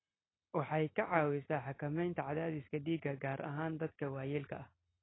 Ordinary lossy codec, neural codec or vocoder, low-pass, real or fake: AAC, 16 kbps; none; 7.2 kHz; real